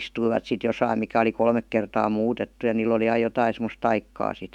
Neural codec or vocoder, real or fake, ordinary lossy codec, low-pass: autoencoder, 48 kHz, 128 numbers a frame, DAC-VAE, trained on Japanese speech; fake; none; 19.8 kHz